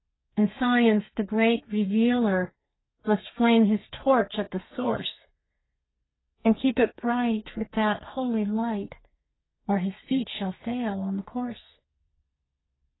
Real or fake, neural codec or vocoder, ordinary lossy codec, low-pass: fake; codec, 32 kHz, 1.9 kbps, SNAC; AAC, 16 kbps; 7.2 kHz